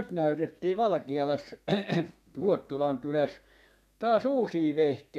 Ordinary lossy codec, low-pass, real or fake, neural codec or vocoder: none; 14.4 kHz; fake; codec, 32 kHz, 1.9 kbps, SNAC